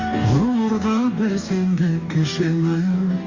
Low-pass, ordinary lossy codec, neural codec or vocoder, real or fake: 7.2 kHz; Opus, 64 kbps; codec, 44.1 kHz, 2.6 kbps, DAC; fake